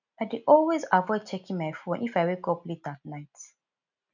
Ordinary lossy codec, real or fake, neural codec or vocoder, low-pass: none; real; none; 7.2 kHz